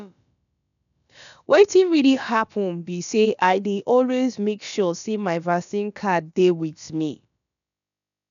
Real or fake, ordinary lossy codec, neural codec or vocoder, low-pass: fake; none; codec, 16 kHz, about 1 kbps, DyCAST, with the encoder's durations; 7.2 kHz